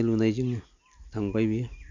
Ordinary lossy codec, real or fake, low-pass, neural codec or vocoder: none; real; 7.2 kHz; none